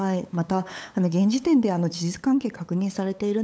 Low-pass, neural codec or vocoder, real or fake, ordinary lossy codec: none; codec, 16 kHz, 8 kbps, FunCodec, trained on LibriTTS, 25 frames a second; fake; none